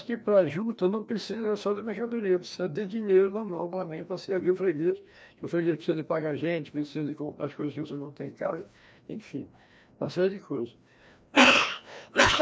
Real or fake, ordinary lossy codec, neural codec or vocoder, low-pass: fake; none; codec, 16 kHz, 1 kbps, FreqCodec, larger model; none